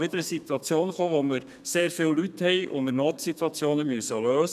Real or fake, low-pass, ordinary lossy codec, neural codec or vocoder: fake; 14.4 kHz; none; codec, 32 kHz, 1.9 kbps, SNAC